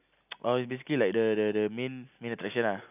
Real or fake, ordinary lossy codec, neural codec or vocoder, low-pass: real; none; none; 3.6 kHz